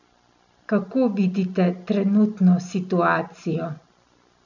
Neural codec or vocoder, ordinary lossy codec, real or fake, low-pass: none; none; real; 7.2 kHz